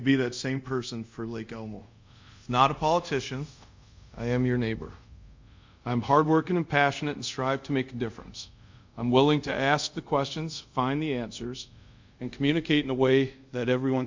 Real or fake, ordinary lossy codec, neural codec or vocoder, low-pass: fake; MP3, 64 kbps; codec, 24 kHz, 0.5 kbps, DualCodec; 7.2 kHz